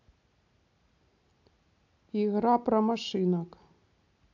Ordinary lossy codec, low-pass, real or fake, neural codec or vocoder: AAC, 48 kbps; 7.2 kHz; real; none